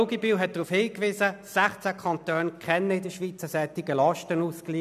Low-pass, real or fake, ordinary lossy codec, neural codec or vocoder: 14.4 kHz; real; none; none